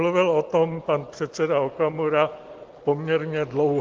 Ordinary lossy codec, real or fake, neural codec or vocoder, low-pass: Opus, 16 kbps; real; none; 7.2 kHz